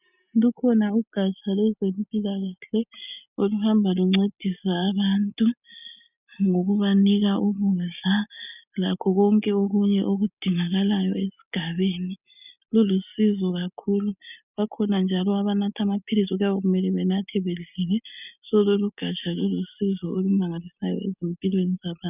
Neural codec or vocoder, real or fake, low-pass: none; real; 3.6 kHz